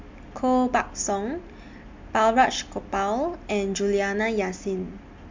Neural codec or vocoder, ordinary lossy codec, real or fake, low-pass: none; MP3, 64 kbps; real; 7.2 kHz